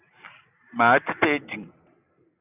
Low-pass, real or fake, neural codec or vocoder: 3.6 kHz; real; none